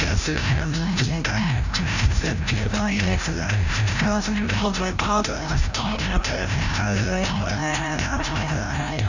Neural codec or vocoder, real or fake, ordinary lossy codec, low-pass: codec, 16 kHz, 0.5 kbps, FreqCodec, larger model; fake; none; 7.2 kHz